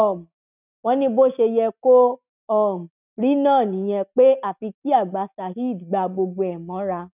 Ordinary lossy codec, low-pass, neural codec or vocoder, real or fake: none; 3.6 kHz; none; real